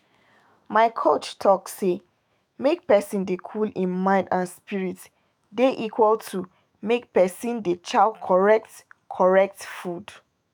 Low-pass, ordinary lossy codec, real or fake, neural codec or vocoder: none; none; fake; autoencoder, 48 kHz, 128 numbers a frame, DAC-VAE, trained on Japanese speech